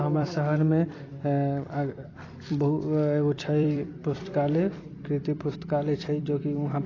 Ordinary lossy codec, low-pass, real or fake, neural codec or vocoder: AAC, 32 kbps; 7.2 kHz; fake; vocoder, 44.1 kHz, 128 mel bands every 256 samples, BigVGAN v2